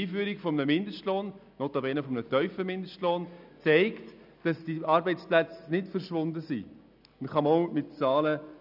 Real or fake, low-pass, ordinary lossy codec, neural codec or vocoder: real; 5.4 kHz; none; none